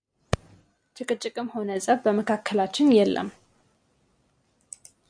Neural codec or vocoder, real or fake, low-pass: none; real; 9.9 kHz